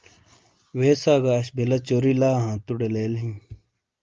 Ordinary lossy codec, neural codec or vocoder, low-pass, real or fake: Opus, 32 kbps; none; 7.2 kHz; real